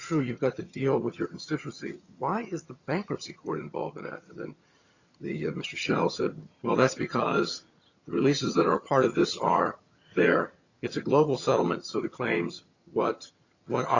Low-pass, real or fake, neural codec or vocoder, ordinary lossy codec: 7.2 kHz; fake; vocoder, 22.05 kHz, 80 mel bands, HiFi-GAN; Opus, 64 kbps